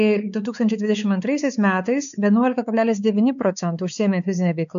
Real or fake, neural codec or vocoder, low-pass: fake; codec, 16 kHz, 4 kbps, X-Codec, WavLM features, trained on Multilingual LibriSpeech; 7.2 kHz